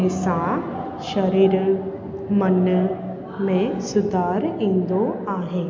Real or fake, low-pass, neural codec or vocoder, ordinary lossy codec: real; 7.2 kHz; none; MP3, 64 kbps